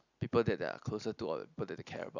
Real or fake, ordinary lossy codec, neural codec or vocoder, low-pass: real; none; none; 7.2 kHz